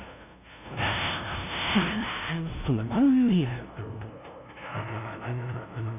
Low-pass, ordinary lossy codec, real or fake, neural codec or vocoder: 3.6 kHz; none; fake; codec, 16 kHz, 0.5 kbps, FunCodec, trained on LibriTTS, 25 frames a second